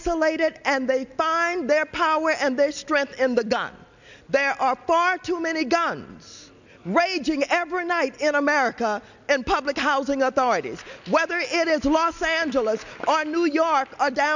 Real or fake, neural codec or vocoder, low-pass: real; none; 7.2 kHz